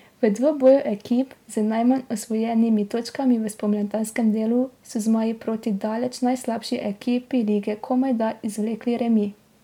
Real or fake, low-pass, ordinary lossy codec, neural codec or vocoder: real; 19.8 kHz; MP3, 96 kbps; none